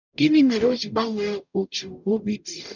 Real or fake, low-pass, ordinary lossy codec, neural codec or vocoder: fake; 7.2 kHz; none; codec, 44.1 kHz, 0.9 kbps, DAC